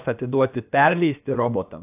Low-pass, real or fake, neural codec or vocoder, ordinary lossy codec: 3.6 kHz; fake; codec, 16 kHz, about 1 kbps, DyCAST, with the encoder's durations; AAC, 32 kbps